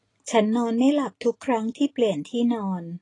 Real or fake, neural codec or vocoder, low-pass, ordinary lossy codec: real; none; 10.8 kHz; AAC, 32 kbps